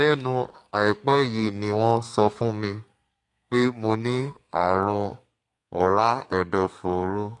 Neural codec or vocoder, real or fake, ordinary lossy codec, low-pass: codec, 32 kHz, 1.9 kbps, SNAC; fake; AAC, 48 kbps; 10.8 kHz